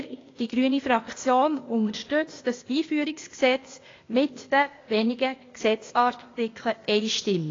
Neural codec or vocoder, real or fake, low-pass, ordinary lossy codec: codec, 16 kHz, 0.8 kbps, ZipCodec; fake; 7.2 kHz; AAC, 32 kbps